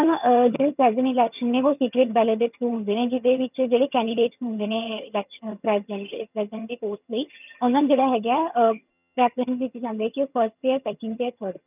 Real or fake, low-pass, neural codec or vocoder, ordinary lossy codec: fake; 3.6 kHz; vocoder, 22.05 kHz, 80 mel bands, HiFi-GAN; none